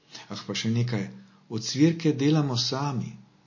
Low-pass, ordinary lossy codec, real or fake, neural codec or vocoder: 7.2 kHz; MP3, 32 kbps; real; none